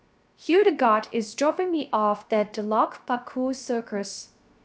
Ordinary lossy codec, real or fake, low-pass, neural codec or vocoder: none; fake; none; codec, 16 kHz, 0.3 kbps, FocalCodec